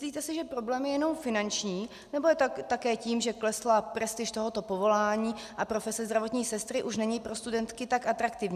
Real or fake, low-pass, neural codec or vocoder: real; 14.4 kHz; none